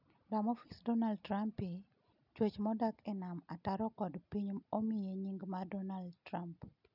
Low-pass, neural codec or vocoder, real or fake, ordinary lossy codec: 5.4 kHz; none; real; none